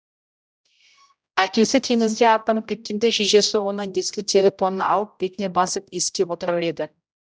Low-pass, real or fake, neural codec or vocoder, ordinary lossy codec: none; fake; codec, 16 kHz, 0.5 kbps, X-Codec, HuBERT features, trained on general audio; none